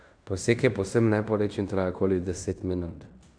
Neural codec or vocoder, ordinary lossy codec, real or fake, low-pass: codec, 16 kHz in and 24 kHz out, 0.9 kbps, LongCat-Audio-Codec, fine tuned four codebook decoder; none; fake; 9.9 kHz